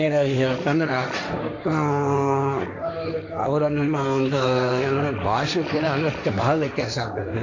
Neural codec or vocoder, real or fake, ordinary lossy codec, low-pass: codec, 16 kHz, 1.1 kbps, Voila-Tokenizer; fake; AAC, 48 kbps; 7.2 kHz